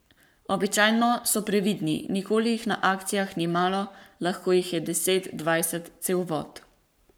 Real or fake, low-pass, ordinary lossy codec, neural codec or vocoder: fake; none; none; codec, 44.1 kHz, 7.8 kbps, Pupu-Codec